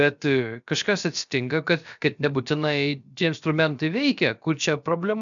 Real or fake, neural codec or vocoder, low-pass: fake; codec, 16 kHz, about 1 kbps, DyCAST, with the encoder's durations; 7.2 kHz